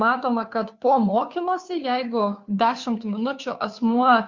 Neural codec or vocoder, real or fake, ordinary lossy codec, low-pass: codec, 16 kHz, 2 kbps, FunCodec, trained on Chinese and English, 25 frames a second; fake; Opus, 64 kbps; 7.2 kHz